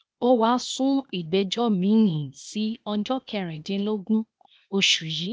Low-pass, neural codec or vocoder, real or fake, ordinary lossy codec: none; codec, 16 kHz, 0.8 kbps, ZipCodec; fake; none